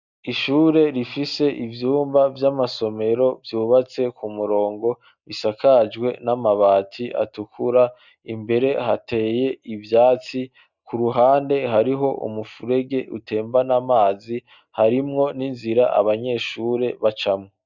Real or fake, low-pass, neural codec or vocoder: real; 7.2 kHz; none